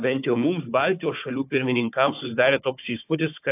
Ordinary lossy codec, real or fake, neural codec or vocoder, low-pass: AAC, 32 kbps; fake; codec, 16 kHz, 4 kbps, FunCodec, trained on Chinese and English, 50 frames a second; 3.6 kHz